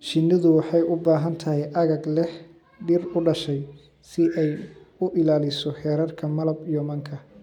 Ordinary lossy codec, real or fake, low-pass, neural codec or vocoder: MP3, 96 kbps; real; 19.8 kHz; none